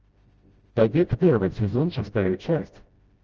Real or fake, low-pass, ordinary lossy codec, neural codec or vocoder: fake; 7.2 kHz; Opus, 24 kbps; codec, 16 kHz, 0.5 kbps, FreqCodec, smaller model